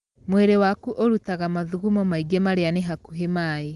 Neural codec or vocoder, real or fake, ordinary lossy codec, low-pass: none; real; Opus, 24 kbps; 10.8 kHz